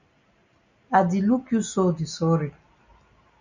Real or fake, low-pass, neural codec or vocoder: real; 7.2 kHz; none